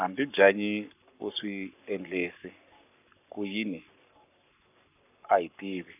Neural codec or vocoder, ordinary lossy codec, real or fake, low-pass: codec, 44.1 kHz, 7.8 kbps, Pupu-Codec; none; fake; 3.6 kHz